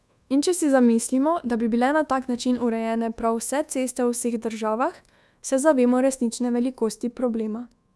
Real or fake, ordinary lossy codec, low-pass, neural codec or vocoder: fake; none; none; codec, 24 kHz, 1.2 kbps, DualCodec